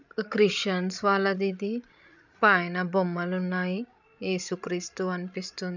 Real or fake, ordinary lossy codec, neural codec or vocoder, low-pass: fake; none; codec, 16 kHz, 16 kbps, FreqCodec, larger model; 7.2 kHz